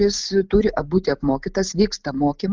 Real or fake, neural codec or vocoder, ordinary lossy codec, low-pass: real; none; Opus, 32 kbps; 7.2 kHz